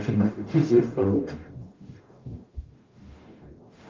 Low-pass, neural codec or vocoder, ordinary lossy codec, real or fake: 7.2 kHz; codec, 44.1 kHz, 0.9 kbps, DAC; Opus, 24 kbps; fake